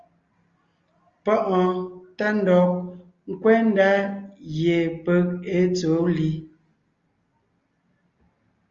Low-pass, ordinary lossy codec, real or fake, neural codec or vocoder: 7.2 kHz; Opus, 32 kbps; real; none